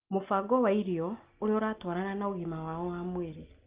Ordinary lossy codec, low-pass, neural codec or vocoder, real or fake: Opus, 32 kbps; 3.6 kHz; none; real